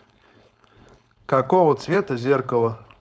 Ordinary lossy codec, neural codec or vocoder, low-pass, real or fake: none; codec, 16 kHz, 4.8 kbps, FACodec; none; fake